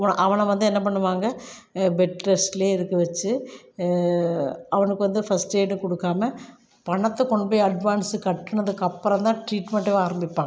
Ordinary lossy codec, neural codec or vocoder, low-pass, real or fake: none; none; none; real